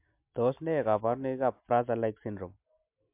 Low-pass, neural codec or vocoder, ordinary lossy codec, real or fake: 3.6 kHz; none; MP3, 32 kbps; real